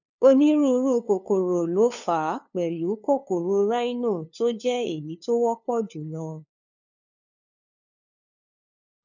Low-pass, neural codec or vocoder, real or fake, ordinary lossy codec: 7.2 kHz; codec, 16 kHz, 2 kbps, FunCodec, trained on LibriTTS, 25 frames a second; fake; none